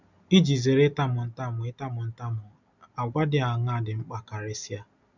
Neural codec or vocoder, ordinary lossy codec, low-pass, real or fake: none; none; 7.2 kHz; real